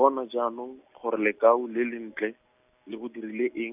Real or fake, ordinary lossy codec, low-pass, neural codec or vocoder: real; none; 3.6 kHz; none